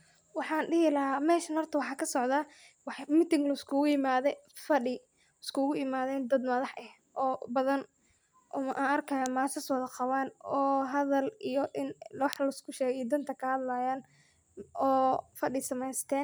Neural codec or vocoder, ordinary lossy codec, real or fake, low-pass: none; none; real; none